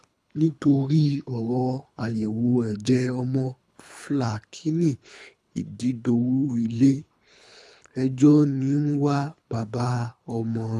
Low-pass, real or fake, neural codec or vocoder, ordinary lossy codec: none; fake; codec, 24 kHz, 3 kbps, HILCodec; none